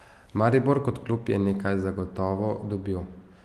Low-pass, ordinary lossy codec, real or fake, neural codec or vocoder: 14.4 kHz; Opus, 24 kbps; real; none